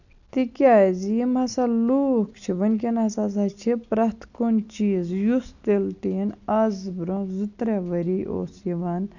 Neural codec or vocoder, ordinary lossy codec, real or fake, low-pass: none; none; real; 7.2 kHz